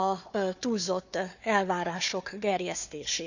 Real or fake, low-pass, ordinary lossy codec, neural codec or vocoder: fake; 7.2 kHz; none; codec, 16 kHz, 4 kbps, FunCodec, trained on Chinese and English, 50 frames a second